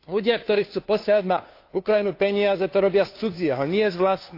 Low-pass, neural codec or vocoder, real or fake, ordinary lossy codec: 5.4 kHz; codec, 16 kHz, 2 kbps, FunCodec, trained on Chinese and English, 25 frames a second; fake; AAC, 32 kbps